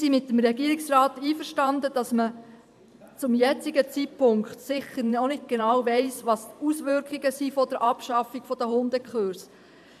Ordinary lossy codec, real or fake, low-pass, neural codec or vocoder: AAC, 96 kbps; fake; 14.4 kHz; vocoder, 44.1 kHz, 128 mel bands every 512 samples, BigVGAN v2